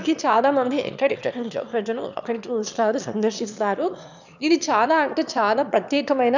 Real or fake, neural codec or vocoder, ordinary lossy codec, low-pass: fake; autoencoder, 22.05 kHz, a latent of 192 numbers a frame, VITS, trained on one speaker; none; 7.2 kHz